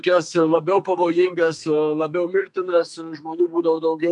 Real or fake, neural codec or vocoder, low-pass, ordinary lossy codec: fake; codec, 32 kHz, 1.9 kbps, SNAC; 10.8 kHz; MP3, 96 kbps